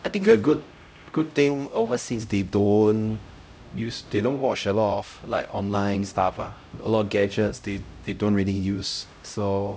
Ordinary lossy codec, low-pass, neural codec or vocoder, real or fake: none; none; codec, 16 kHz, 0.5 kbps, X-Codec, HuBERT features, trained on LibriSpeech; fake